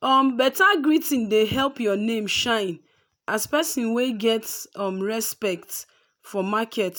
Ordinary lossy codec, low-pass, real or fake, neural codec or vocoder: none; none; real; none